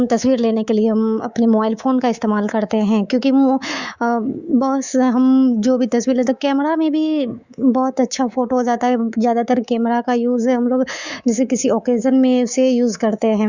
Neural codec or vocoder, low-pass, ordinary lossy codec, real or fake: codec, 24 kHz, 3.1 kbps, DualCodec; 7.2 kHz; Opus, 64 kbps; fake